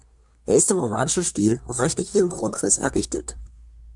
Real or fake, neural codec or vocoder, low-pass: fake; codec, 24 kHz, 1 kbps, SNAC; 10.8 kHz